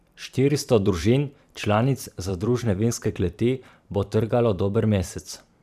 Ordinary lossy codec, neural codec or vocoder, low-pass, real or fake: none; vocoder, 44.1 kHz, 128 mel bands every 512 samples, BigVGAN v2; 14.4 kHz; fake